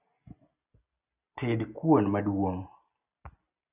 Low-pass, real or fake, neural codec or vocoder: 3.6 kHz; real; none